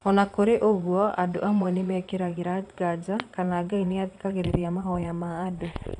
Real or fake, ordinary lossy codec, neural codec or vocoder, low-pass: fake; none; vocoder, 22.05 kHz, 80 mel bands, Vocos; 9.9 kHz